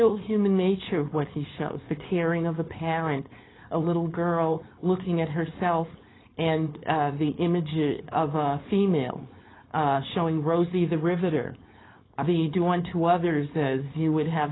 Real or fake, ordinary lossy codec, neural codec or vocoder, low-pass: fake; AAC, 16 kbps; codec, 16 kHz, 4.8 kbps, FACodec; 7.2 kHz